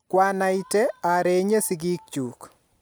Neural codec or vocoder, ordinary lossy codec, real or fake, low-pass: none; none; real; none